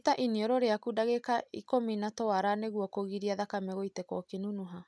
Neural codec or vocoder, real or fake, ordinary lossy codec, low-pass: none; real; none; none